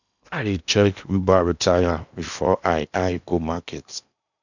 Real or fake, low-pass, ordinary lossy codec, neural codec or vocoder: fake; 7.2 kHz; none; codec, 16 kHz in and 24 kHz out, 0.8 kbps, FocalCodec, streaming, 65536 codes